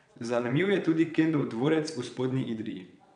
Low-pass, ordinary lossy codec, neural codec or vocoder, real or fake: 9.9 kHz; none; vocoder, 22.05 kHz, 80 mel bands, WaveNeXt; fake